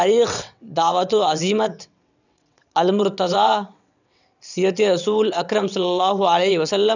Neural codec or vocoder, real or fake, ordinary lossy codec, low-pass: vocoder, 22.05 kHz, 80 mel bands, WaveNeXt; fake; none; 7.2 kHz